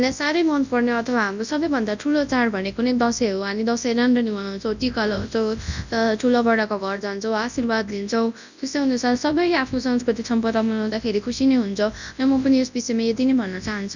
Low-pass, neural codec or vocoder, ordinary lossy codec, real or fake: 7.2 kHz; codec, 24 kHz, 0.9 kbps, WavTokenizer, large speech release; none; fake